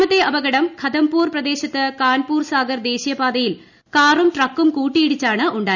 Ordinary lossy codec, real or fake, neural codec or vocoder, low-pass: none; real; none; 7.2 kHz